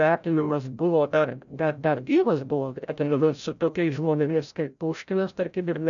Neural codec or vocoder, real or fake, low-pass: codec, 16 kHz, 0.5 kbps, FreqCodec, larger model; fake; 7.2 kHz